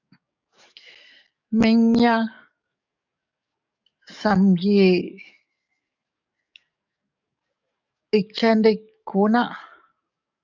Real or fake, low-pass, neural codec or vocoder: fake; 7.2 kHz; codec, 44.1 kHz, 7.8 kbps, DAC